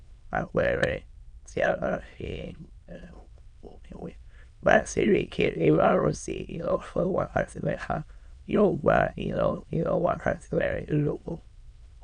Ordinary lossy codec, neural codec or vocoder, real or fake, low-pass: none; autoencoder, 22.05 kHz, a latent of 192 numbers a frame, VITS, trained on many speakers; fake; 9.9 kHz